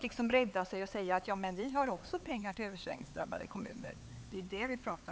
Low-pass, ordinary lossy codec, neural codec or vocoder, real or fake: none; none; codec, 16 kHz, 4 kbps, X-Codec, HuBERT features, trained on LibriSpeech; fake